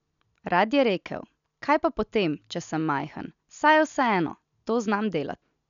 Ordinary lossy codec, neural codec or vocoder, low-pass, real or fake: none; none; 7.2 kHz; real